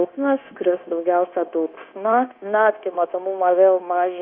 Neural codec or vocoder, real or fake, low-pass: codec, 16 kHz, 0.9 kbps, LongCat-Audio-Codec; fake; 5.4 kHz